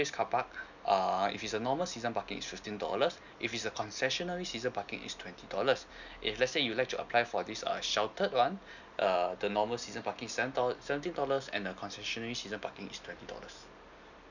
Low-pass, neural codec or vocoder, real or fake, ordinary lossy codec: 7.2 kHz; none; real; none